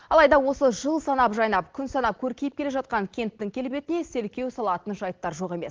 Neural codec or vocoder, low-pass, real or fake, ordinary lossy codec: none; 7.2 kHz; real; Opus, 16 kbps